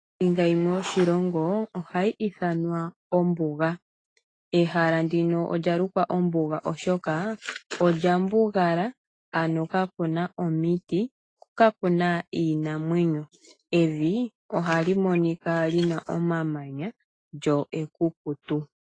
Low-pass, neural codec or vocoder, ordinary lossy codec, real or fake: 9.9 kHz; autoencoder, 48 kHz, 128 numbers a frame, DAC-VAE, trained on Japanese speech; AAC, 32 kbps; fake